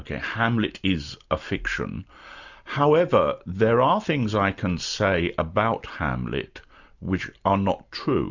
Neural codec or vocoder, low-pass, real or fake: none; 7.2 kHz; real